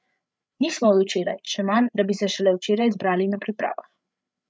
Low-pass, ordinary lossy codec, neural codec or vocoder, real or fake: none; none; codec, 16 kHz, 8 kbps, FreqCodec, larger model; fake